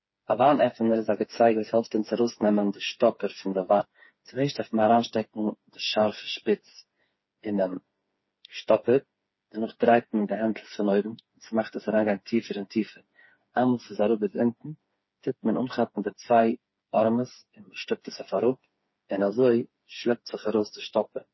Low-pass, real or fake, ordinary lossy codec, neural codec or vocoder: 7.2 kHz; fake; MP3, 24 kbps; codec, 16 kHz, 4 kbps, FreqCodec, smaller model